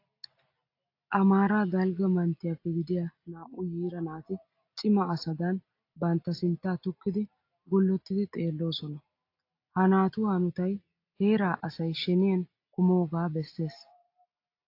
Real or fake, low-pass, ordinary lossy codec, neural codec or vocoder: real; 5.4 kHz; AAC, 32 kbps; none